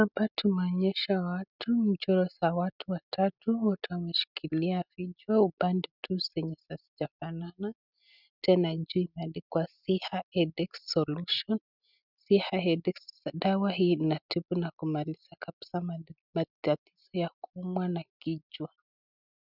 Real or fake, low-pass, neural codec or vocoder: real; 5.4 kHz; none